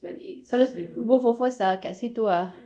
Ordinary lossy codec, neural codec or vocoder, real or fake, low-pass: none; codec, 24 kHz, 0.5 kbps, DualCodec; fake; 9.9 kHz